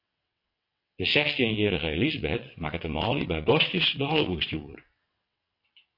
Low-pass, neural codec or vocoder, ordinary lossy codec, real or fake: 5.4 kHz; vocoder, 22.05 kHz, 80 mel bands, WaveNeXt; MP3, 32 kbps; fake